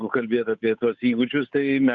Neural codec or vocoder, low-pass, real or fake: codec, 16 kHz, 8 kbps, FunCodec, trained on Chinese and English, 25 frames a second; 7.2 kHz; fake